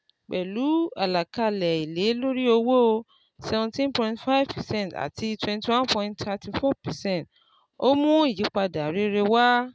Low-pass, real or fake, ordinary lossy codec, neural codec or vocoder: none; real; none; none